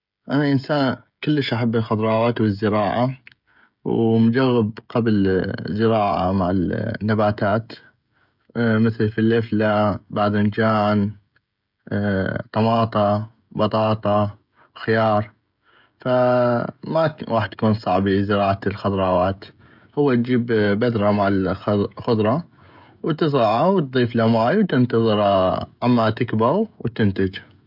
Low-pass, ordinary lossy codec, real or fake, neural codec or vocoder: 5.4 kHz; none; fake; codec, 16 kHz, 16 kbps, FreqCodec, smaller model